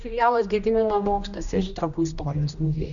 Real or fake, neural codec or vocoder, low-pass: fake; codec, 16 kHz, 1 kbps, X-Codec, HuBERT features, trained on general audio; 7.2 kHz